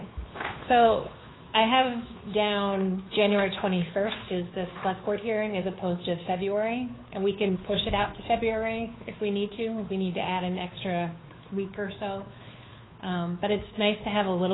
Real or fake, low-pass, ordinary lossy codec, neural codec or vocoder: fake; 7.2 kHz; AAC, 16 kbps; codec, 16 kHz, 2 kbps, FunCodec, trained on Chinese and English, 25 frames a second